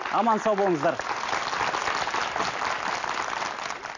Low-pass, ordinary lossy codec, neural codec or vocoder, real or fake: 7.2 kHz; none; none; real